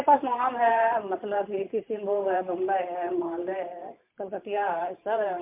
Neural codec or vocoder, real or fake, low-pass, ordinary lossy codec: vocoder, 44.1 kHz, 128 mel bands every 512 samples, BigVGAN v2; fake; 3.6 kHz; MP3, 32 kbps